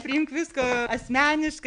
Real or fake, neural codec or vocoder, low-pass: real; none; 9.9 kHz